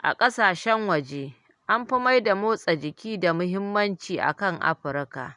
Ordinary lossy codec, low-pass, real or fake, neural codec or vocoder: none; 10.8 kHz; real; none